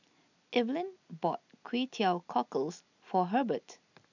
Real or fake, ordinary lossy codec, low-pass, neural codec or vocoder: real; none; 7.2 kHz; none